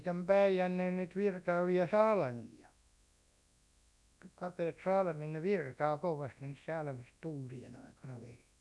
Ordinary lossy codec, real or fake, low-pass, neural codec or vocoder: none; fake; none; codec, 24 kHz, 0.9 kbps, WavTokenizer, large speech release